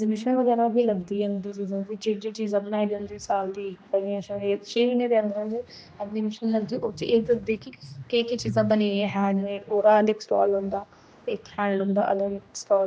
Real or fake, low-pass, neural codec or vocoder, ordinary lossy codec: fake; none; codec, 16 kHz, 1 kbps, X-Codec, HuBERT features, trained on general audio; none